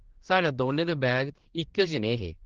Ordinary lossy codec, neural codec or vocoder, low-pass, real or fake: Opus, 16 kbps; codec, 16 kHz, 2 kbps, X-Codec, HuBERT features, trained on general audio; 7.2 kHz; fake